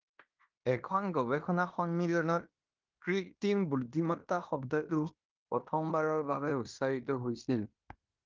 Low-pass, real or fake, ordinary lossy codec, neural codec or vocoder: 7.2 kHz; fake; Opus, 24 kbps; codec, 16 kHz in and 24 kHz out, 0.9 kbps, LongCat-Audio-Codec, fine tuned four codebook decoder